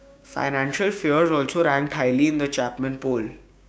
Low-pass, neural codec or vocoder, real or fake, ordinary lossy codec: none; codec, 16 kHz, 6 kbps, DAC; fake; none